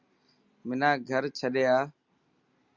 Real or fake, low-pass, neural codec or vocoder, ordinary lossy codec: real; 7.2 kHz; none; Opus, 64 kbps